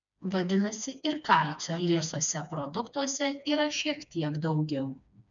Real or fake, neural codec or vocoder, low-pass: fake; codec, 16 kHz, 2 kbps, FreqCodec, smaller model; 7.2 kHz